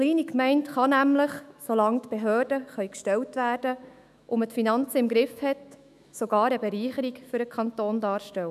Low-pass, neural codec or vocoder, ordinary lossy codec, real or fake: 14.4 kHz; autoencoder, 48 kHz, 128 numbers a frame, DAC-VAE, trained on Japanese speech; none; fake